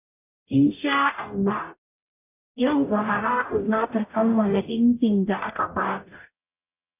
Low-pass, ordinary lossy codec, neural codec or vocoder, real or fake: 3.6 kHz; none; codec, 44.1 kHz, 0.9 kbps, DAC; fake